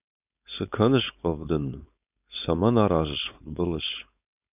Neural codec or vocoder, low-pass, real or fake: codec, 16 kHz, 4.8 kbps, FACodec; 3.6 kHz; fake